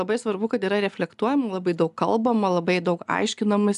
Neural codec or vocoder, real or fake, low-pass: none; real; 10.8 kHz